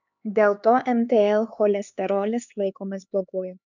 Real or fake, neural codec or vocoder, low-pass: fake; codec, 16 kHz, 4 kbps, X-Codec, HuBERT features, trained on LibriSpeech; 7.2 kHz